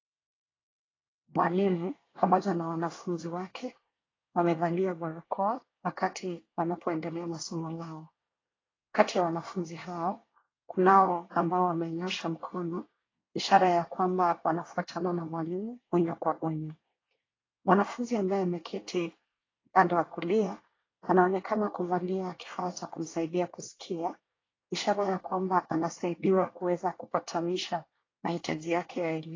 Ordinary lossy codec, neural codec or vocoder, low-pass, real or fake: AAC, 32 kbps; codec, 24 kHz, 1 kbps, SNAC; 7.2 kHz; fake